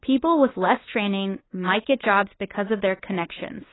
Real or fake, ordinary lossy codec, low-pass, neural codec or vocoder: fake; AAC, 16 kbps; 7.2 kHz; codec, 16 kHz, 2 kbps, FunCodec, trained on LibriTTS, 25 frames a second